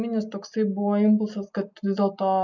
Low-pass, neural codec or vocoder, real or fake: 7.2 kHz; none; real